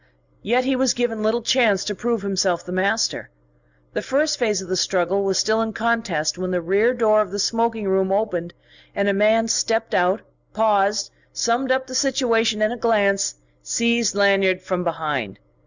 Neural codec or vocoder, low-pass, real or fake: none; 7.2 kHz; real